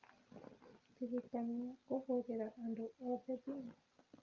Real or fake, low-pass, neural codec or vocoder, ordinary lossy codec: real; 7.2 kHz; none; Opus, 24 kbps